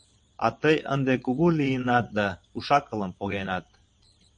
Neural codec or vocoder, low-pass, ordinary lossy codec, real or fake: vocoder, 22.05 kHz, 80 mel bands, WaveNeXt; 9.9 kHz; MP3, 48 kbps; fake